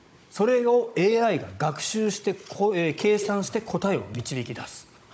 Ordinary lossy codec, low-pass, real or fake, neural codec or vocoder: none; none; fake; codec, 16 kHz, 16 kbps, FunCodec, trained on Chinese and English, 50 frames a second